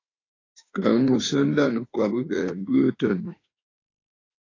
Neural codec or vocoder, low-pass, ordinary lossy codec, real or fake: autoencoder, 48 kHz, 32 numbers a frame, DAC-VAE, trained on Japanese speech; 7.2 kHz; AAC, 32 kbps; fake